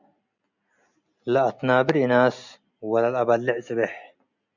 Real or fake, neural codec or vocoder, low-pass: real; none; 7.2 kHz